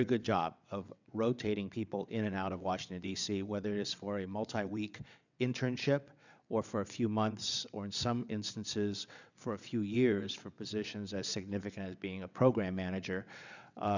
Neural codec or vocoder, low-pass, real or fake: vocoder, 22.05 kHz, 80 mel bands, WaveNeXt; 7.2 kHz; fake